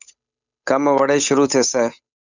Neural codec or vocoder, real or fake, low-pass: codec, 16 kHz, 8 kbps, FunCodec, trained on Chinese and English, 25 frames a second; fake; 7.2 kHz